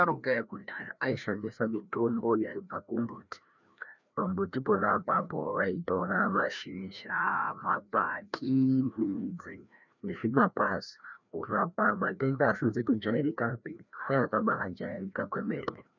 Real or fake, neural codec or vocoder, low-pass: fake; codec, 16 kHz, 1 kbps, FreqCodec, larger model; 7.2 kHz